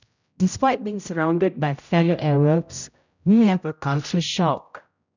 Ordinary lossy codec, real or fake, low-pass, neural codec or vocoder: none; fake; 7.2 kHz; codec, 16 kHz, 0.5 kbps, X-Codec, HuBERT features, trained on general audio